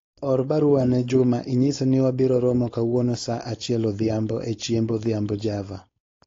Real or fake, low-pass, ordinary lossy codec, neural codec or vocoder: fake; 7.2 kHz; AAC, 32 kbps; codec, 16 kHz, 4.8 kbps, FACodec